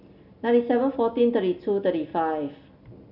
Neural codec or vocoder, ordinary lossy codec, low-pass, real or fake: none; none; 5.4 kHz; real